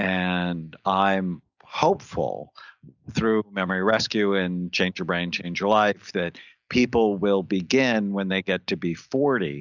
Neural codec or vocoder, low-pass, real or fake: none; 7.2 kHz; real